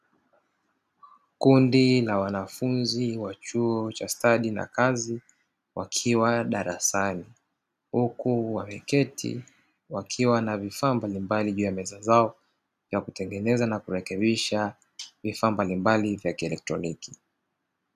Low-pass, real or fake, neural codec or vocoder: 14.4 kHz; real; none